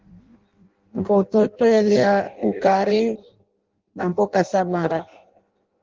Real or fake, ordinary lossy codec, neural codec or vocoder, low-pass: fake; Opus, 16 kbps; codec, 16 kHz in and 24 kHz out, 0.6 kbps, FireRedTTS-2 codec; 7.2 kHz